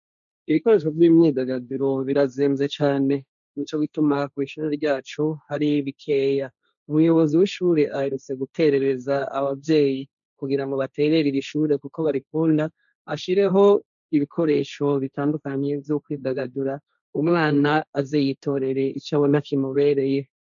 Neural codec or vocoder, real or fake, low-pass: codec, 16 kHz, 1.1 kbps, Voila-Tokenizer; fake; 7.2 kHz